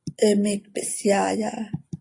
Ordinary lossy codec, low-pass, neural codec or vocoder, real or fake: AAC, 48 kbps; 10.8 kHz; none; real